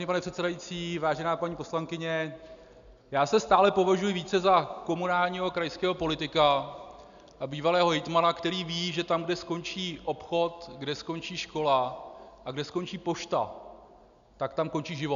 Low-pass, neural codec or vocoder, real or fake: 7.2 kHz; none; real